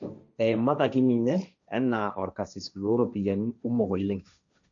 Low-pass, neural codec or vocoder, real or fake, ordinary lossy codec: 7.2 kHz; codec, 16 kHz, 1.1 kbps, Voila-Tokenizer; fake; none